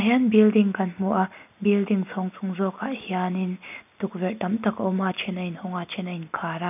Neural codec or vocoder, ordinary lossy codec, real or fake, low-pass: none; none; real; 3.6 kHz